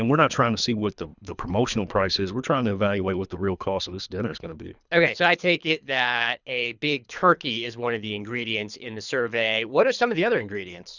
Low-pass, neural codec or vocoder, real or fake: 7.2 kHz; codec, 24 kHz, 3 kbps, HILCodec; fake